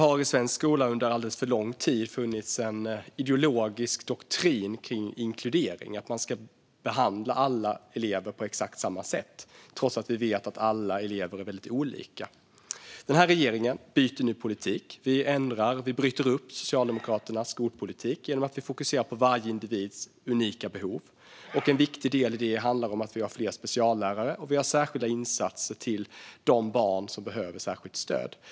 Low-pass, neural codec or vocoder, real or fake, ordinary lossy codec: none; none; real; none